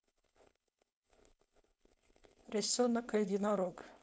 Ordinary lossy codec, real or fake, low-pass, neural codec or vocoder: none; fake; none; codec, 16 kHz, 4.8 kbps, FACodec